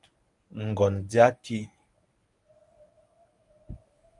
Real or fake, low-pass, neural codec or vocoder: fake; 10.8 kHz; codec, 24 kHz, 0.9 kbps, WavTokenizer, medium speech release version 1